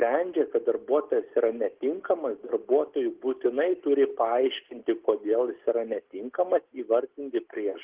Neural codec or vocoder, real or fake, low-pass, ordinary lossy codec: none; real; 3.6 kHz; Opus, 16 kbps